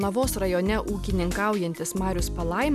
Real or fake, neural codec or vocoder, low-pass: real; none; 14.4 kHz